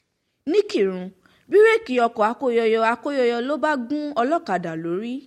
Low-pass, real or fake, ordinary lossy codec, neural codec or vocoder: 19.8 kHz; real; MP3, 64 kbps; none